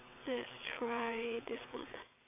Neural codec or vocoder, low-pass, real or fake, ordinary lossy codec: vocoder, 22.05 kHz, 80 mel bands, WaveNeXt; 3.6 kHz; fake; none